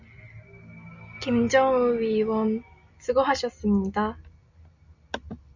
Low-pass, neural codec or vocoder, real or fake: 7.2 kHz; none; real